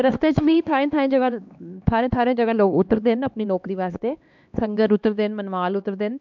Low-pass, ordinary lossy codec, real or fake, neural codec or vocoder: 7.2 kHz; MP3, 64 kbps; fake; codec, 16 kHz, 2 kbps, X-Codec, HuBERT features, trained on LibriSpeech